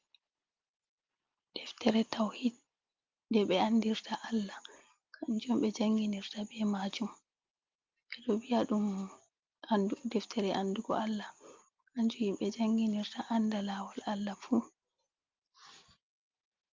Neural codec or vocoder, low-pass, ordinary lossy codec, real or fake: none; 7.2 kHz; Opus, 24 kbps; real